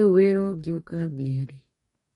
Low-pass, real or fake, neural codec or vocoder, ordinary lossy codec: 19.8 kHz; fake; codec, 44.1 kHz, 2.6 kbps, DAC; MP3, 48 kbps